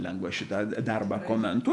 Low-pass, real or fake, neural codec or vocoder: 10.8 kHz; fake; vocoder, 48 kHz, 128 mel bands, Vocos